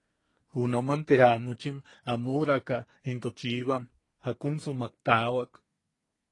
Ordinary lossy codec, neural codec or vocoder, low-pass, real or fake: AAC, 32 kbps; codec, 24 kHz, 1 kbps, SNAC; 10.8 kHz; fake